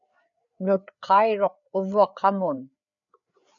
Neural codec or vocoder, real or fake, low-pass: codec, 16 kHz, 4 kbps, FreqCodec, larger model; fake; 7.2 kHz